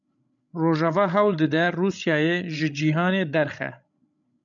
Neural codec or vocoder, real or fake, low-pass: codec, 16 kHz, 16 kbps, FreqCodec, larger model; fake; 7.2 kHz